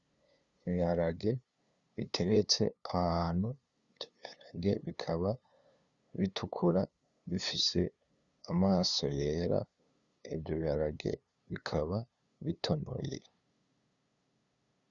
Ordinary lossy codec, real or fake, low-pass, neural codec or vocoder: Opus, 64 kbps; fake; 7.2 kHz; codec, 16 kHz, 2 kbps, FunCodec, trained on LibriTTS, 25 frames a second